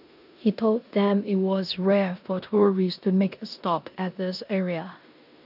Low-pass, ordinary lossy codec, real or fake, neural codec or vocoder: 5.4 kHz; none; fake; codec, 16 kHz in and 24 kHz out, 0.9 kbps, LongCat-Audio-Codec, four codebook decoder